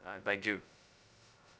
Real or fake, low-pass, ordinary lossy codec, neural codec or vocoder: fake; none; none; codec, 16 kHz, 0.2 kbps, FocalCodec